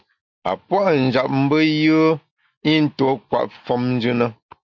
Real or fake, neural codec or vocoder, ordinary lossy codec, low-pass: real; none; MP3, 48 kbps; 7.2 kHz